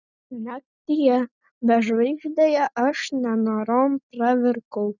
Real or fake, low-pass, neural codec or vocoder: real; 7.2 kHz; none